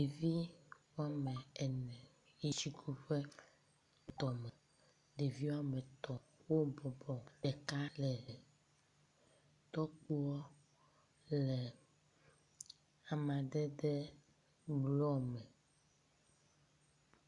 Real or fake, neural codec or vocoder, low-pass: real; none; 10.8 kHz